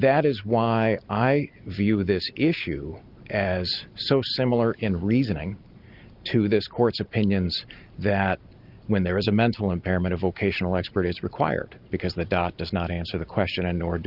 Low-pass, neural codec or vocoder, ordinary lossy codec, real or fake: 5.4 kHz; none; Opus, 32 kbps; real